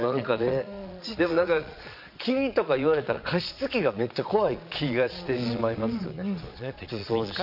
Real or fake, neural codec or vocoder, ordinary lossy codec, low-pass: fake; vocoder, 22.05 kHz, 80 mel bands, Vocos; none; 5.4 kHz